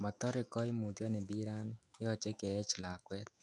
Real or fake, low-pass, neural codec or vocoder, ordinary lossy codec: fake; 10.8 kHz; autoencoder, 48 kHz, 128 numbers a frame, DAC-VAE, trained on Japanese speech; none